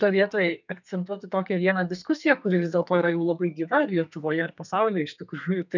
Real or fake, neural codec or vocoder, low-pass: fake; codec, 32 kHz, 1.9 kbps, SNAC; 7.2 kHz